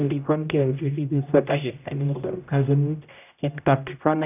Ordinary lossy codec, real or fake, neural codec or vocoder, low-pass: none; fake; codec, 16 kHz, 0.5 kbps, X-Codec, HuBERT features, trained on general audio; 3.6 kHz